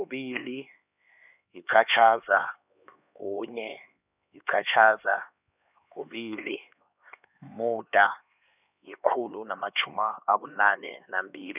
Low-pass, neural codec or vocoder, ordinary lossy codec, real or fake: 3.6 kHz; codec, 16 kHz, 2 kbps, X-Codec, HuBERT features, trained on LibriSpeech; none; fake